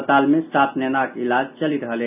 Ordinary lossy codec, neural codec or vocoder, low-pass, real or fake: none; none; 3.6 kHz; real